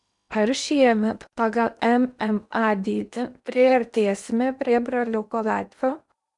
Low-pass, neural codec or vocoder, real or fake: 10.8 kHz; codec, 16 kHz in and 24 kHz out, 0.8 kbps, FocalCodec, streaming, 65536 codes; fake